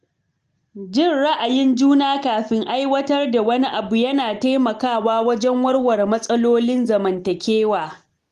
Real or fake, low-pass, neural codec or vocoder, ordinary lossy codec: real; 14.4 kHz; none; Opus, 24 kbps